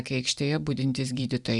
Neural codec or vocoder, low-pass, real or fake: vocoder, 44.1 kHz, 128 mel bands every 256 samples, BigVGAN v2; 10.8 kHz; fake